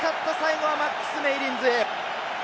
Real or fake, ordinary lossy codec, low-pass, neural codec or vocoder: real; none; none; none